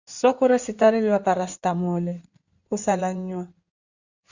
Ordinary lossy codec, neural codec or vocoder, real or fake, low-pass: Opus, 64 kbps; codec, 16 kHz in and 24 kHz out, 2.2 kbps, FireRedTTS-2 codec; fake; 7.2 kHz